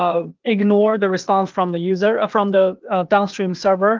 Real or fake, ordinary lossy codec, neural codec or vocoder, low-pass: fake; Opus, 32 kbps; codec, 16 kHz, 0.8 kbps, ZipCodec; 7.2 kHz